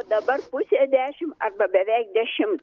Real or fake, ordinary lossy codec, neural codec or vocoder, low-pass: real; Opus, 32 kbps; none; 7.2 kHz